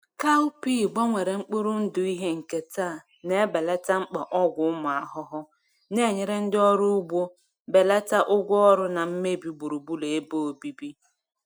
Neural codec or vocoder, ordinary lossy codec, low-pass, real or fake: none; none; none; real